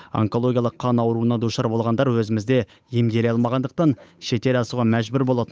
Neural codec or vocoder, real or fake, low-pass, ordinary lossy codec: codec, 16 kHz, 8 kbps, FunCodec, trained on Chinese and English, 25 frames a second; fake; none; none